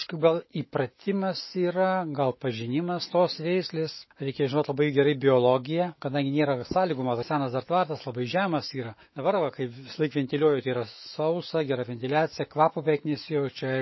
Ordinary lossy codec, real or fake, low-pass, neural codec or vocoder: MP3, 24 kbps; fake; 7.2 kHz; autoencoder, 48 kHz, 128 numbers a frame, DAC-VAE, trained on Japanese speech